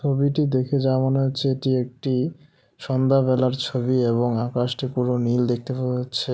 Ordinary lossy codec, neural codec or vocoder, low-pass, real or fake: none; none; none; real